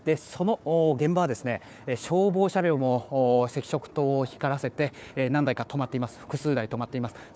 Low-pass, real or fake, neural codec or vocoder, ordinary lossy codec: none; fake; codec, 16 kHz, 4 kbps, FunCodec, trained on Chinese and English, 50 frames a second; none